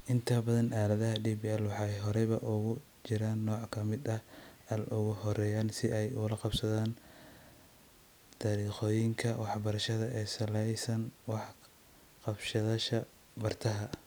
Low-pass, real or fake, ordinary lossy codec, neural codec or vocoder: none; real; none; none